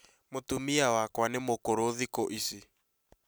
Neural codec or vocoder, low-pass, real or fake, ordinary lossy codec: none; none; real; none